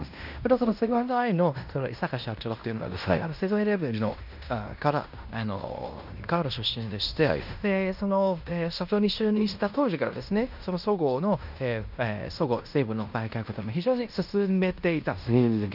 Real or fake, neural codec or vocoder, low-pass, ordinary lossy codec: fake; codec, 16 kHz in and 24 kHz out, 0.9 kbps, LongCat-Audio-Codec, fine tuned four codebook decoder; 5.4 kHz; none